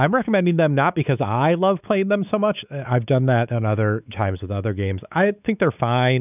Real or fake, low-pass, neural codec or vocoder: fake; 3.6 kHz; codec, 16 kHz, 4 kbps, X-Codec, WavLM features, trained on Multilingual LibriSpeech